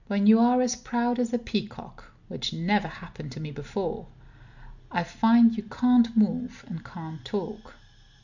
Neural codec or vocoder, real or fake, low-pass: none; real; 7.2 kHz